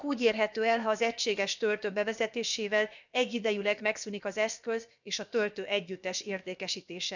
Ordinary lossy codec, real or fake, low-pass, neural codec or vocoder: none; fake; 7.2 kHz; codec, 16 kHz, about 1 kbps, DyCAST, with the encoder's durations